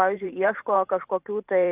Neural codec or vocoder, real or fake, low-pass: vocoder, 44.1 kHz, 128 mel bands every 512 samples, BigVGAN v2; fake; 3.6 kHz